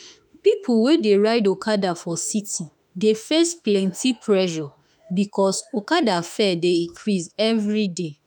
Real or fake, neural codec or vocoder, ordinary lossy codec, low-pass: fake; autoencoder, 48 kHz, 32 numbers a frame, DAC-VAE, trained on Japanese speech; none; 19.8 kHz